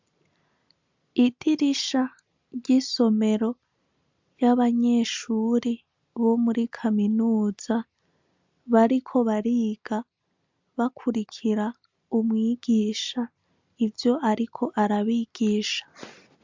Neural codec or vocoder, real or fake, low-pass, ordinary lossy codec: none; real; 7.2 kHz; MP3, 64 kbps